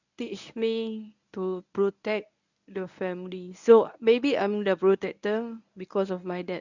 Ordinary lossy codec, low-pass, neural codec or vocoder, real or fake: none; 7.2 kHz; codec, 24 kHz, 0.9 kbps, WavTokenizer, medium speech release version 1; fake